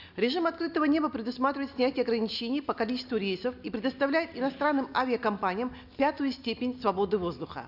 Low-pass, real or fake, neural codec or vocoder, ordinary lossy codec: 5.4 kHz; real; none; none